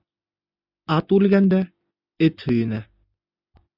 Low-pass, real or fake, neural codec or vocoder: 5.4 kHz; real; none